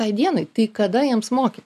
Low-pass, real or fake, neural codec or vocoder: 14.4 kHz; real; none